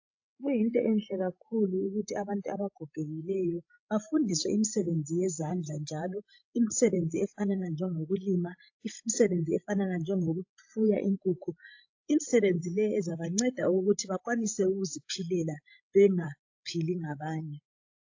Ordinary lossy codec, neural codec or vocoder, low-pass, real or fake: AAC, 48 kbps; codec, 16 kHz, 16 kbps, FreqCodec, larger model; 7.2 kHz; fake